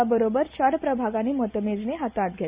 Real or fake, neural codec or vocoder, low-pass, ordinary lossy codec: real; none; 3.6 kHz; Opus, 64 kbps